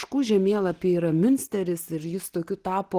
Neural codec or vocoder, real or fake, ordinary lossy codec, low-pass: none; real; Opus, 16 kbps; 14.4 kHz